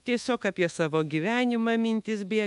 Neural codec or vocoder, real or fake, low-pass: codec, 24 kHz, 1.2 kbps, DualCodec; fake; 10.8 kHz